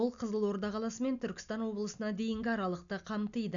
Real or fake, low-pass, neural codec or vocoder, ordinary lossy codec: real; 7.2 kHz; none; MP3, 96 kbps